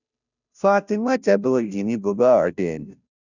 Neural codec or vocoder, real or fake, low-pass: codec, 16 kHz, 0.5 kbps, FunCodec, trained on Chinese and English, 25 frames a second; fake; 7.2 kHz